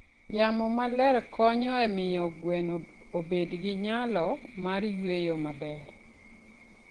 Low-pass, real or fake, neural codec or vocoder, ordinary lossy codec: 9.9 kHz; fake; vocoder, 22.05 kHz, 80 mel bands, WaveNeXt; Opus, 16 kbps